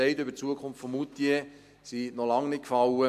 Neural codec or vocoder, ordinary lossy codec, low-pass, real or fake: none; MP3, 96 kbps; 14.4 kHz; real